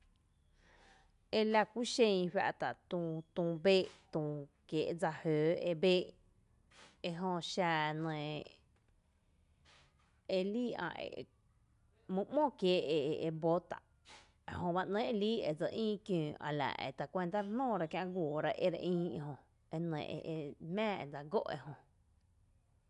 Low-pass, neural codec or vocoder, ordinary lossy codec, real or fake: 10.8 kHz; none; none; real